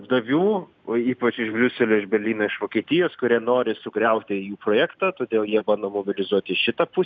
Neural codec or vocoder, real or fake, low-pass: none; real; 7.2 kHz